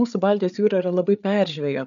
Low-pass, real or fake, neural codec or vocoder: 7.2 kHz; fake; codec, 16 kHz, 8 kbps, FreqCodec, larger model